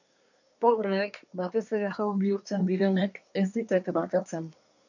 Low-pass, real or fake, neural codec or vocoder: 7.2 kHz; fake; codec, 24 kHz, 1 kbps, SNAC